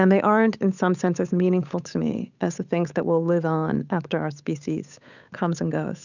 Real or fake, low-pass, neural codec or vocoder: fake; 7.2 kHz; codec, 16 kHz, 8 kbps, FunCodec, trained on Chinese and English, 25 frames a second